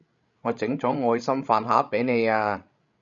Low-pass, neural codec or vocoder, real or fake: 7.2 kHz; codec, 16 kHz, 16 kbps, FreqCodec, larger model; fake